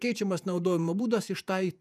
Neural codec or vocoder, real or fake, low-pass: vocoder, 48 kHz, 128 mel bands, Vocos; fake; 14.4 kHz